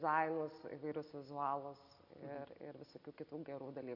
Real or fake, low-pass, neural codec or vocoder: real; 5.4 kHz; none